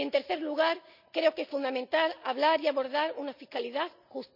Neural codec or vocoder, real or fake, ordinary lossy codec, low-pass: none; real; none; 5.4 kHz